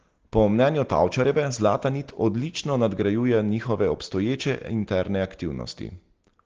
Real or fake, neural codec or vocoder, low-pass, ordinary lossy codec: real; none; 7.2 kHz; Opus, 16 kbps